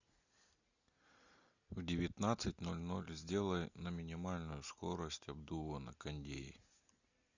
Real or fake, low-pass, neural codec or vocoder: real; 7.2 kHz; none